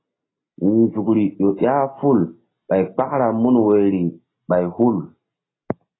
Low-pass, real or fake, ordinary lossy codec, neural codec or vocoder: 7.2 kHz; real; AAC, 16 kbps; none